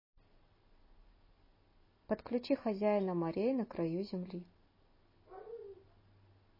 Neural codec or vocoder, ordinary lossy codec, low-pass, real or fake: none; MP3, 24 kbps; 5.4 kHz; real